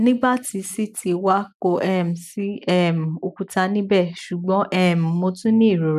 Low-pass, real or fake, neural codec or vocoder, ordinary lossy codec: 14.4 kHz; real; none; none